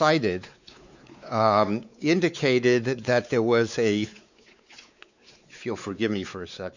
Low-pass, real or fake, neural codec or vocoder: 7.2 kHz; fake; codec, 16 kHz, 4 kbps, X-Codec, WavLM features, trained on Multilingual LibriSpeech